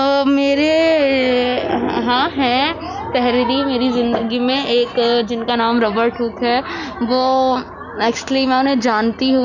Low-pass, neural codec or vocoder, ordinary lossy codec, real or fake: 7.2 kHz; none; none; real